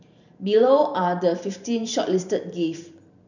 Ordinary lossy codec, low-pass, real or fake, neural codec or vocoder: none; 7.2 kHz; fake; vocoder, 44.1 kHz, 128 mel bands every 512 samples, BigVGAN v2